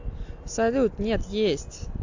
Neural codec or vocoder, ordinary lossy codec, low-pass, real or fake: none; AAC, 48 kbps; 7.2 kHz; real